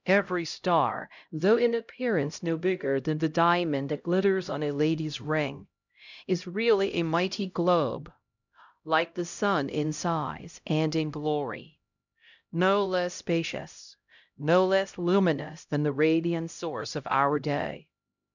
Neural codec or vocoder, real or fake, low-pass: codec, 16 kHz, 0.5 kbps, X-Codec, HuBERT features, trained on LibriSpeech; fake; 7.2 kHz